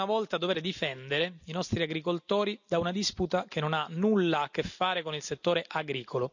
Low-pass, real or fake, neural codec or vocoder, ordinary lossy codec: 7.2 kHz; real; none; none